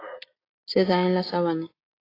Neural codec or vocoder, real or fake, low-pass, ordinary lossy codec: none; real; 5.4 kHz; AAC, 24 kbps